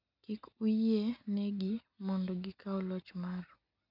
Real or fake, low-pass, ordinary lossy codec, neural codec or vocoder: real; 5.4 kHz; none; none